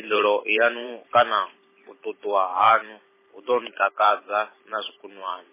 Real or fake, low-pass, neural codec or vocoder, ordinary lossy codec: fake; 3.6 kHz; vocoder, 44.1 kHz, 128 mel bands every 512 samples, BigVGAN v2; MP3, 16 kbps